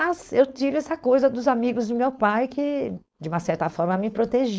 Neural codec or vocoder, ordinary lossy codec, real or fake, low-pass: codec, 16 kHz, 4.8 kbps, FACodec; none; fake; none